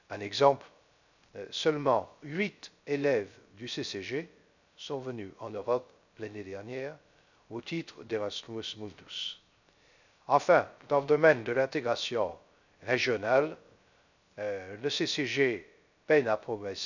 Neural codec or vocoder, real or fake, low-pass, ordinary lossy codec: codec, 16 kHz, 0.3 kbps, FocalCodec; fake; 7.2 kHz; none